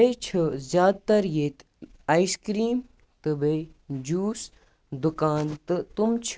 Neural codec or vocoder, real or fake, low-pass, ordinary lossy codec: none; real; none; none